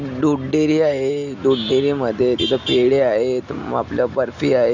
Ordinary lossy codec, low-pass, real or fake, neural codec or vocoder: none; 7.2 kHz; real; none